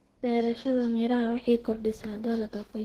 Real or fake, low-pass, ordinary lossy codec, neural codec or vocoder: fake; 14.4 kHz; Opus, 16 kbps; codec, 32 kHz, 1.9 kbps, SNAC